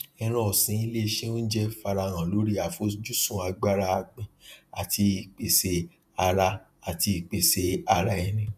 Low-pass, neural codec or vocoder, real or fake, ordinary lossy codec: 14.4 kHz; vocoder, 48 kHz, 128 mel bands, Vocos; fake; none